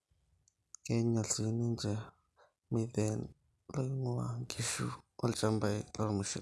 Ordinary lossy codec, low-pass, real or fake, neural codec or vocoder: none; none; real; none